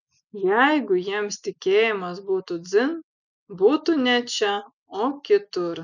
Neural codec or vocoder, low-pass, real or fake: none; 7.2 kHz; real